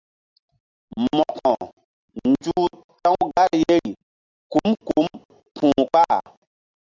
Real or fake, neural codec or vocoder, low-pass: real; none; 7.2 kHz